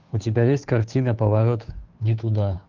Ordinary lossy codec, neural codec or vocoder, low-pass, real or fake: Opus, 24 kbps; codec, 16 kHz, 2 kbps, FunCodec, trained on Chinese and English, 25 frames a second; 7.2 kHz; fake